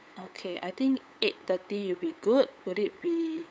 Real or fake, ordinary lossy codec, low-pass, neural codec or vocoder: fake; none; none; codec, 16 kHz, 8 kbps, FunCodec, trained on LibriTTS, 25 frames a second